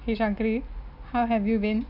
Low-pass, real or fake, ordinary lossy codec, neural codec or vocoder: 5.4 kHz; real; none; none